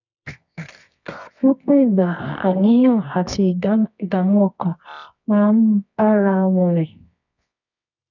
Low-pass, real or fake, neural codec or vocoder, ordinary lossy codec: 7.2 kHz; fake; codec, 24 kHz, 0.9 kbps, WavTokenizer, medium music audio release; none